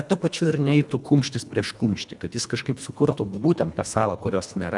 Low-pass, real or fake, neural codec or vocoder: 10.8 kHz; fake; codec, 24 kHz, 1.5 kbps, HILCodec